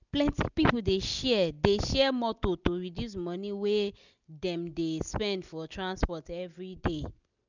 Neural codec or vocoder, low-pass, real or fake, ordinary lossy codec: none; 7.2 kHz; real; none